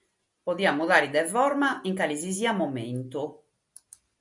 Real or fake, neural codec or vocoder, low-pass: real; none; 10.8 kHz